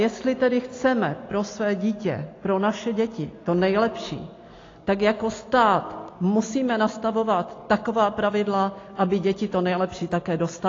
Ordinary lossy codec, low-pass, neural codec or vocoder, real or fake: AAC, 32 kbps; 7.2 kHz; none; real